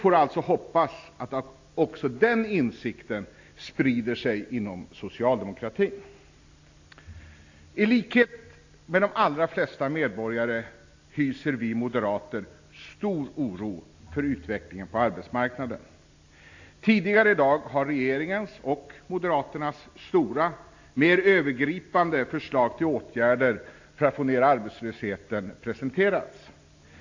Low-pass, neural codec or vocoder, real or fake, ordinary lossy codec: 7.2 kHz; none; real; AAC, 48 kbps